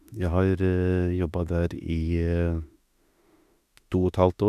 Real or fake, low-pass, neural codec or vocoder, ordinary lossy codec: fake; 14.4 kHz; autoencoder, 48 kHz, 32 numbers a frame, DAC-VAE, trained on Japanese speech; none